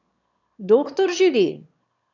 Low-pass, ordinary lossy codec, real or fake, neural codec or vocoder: 7.2 kHz; none; fake; autoencoder, 22.05 kHz, a latent of 192 numbers a frame, VITS, trained on one speaker